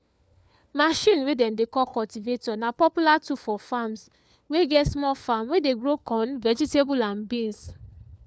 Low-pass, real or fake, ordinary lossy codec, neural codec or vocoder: none; fake; none; codec, 16 kHz, 4 kbps, FunCodec, trained on LibriTTS, 50 frames a second